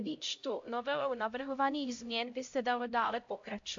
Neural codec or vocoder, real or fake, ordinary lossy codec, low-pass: codec, 16 kHz, 0.5 kbps, X-Codec, HuBERT features, trained on LibriSpeech; fake; AAC, 48 kbps; 7.2 kHz